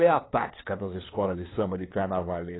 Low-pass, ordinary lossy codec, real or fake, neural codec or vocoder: 7.2 kHz; AAC, 16 kbps; fake; codec, 16 kHz, 2 kbps, FunCodec, trained on LibriTTS, 25 frames a second